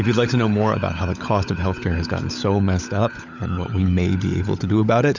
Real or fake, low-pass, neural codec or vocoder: fake; 7.2 kHz; codec, 16 kHz, 16 kbps, FunCodec, trained on LibriTTS, 50 frames a second